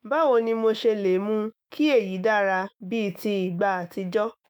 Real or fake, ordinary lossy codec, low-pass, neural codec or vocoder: fake; none; none; autoencoder, 48 kHz, 128 numbers a frame, DAC-VAE, trained on Japanese speech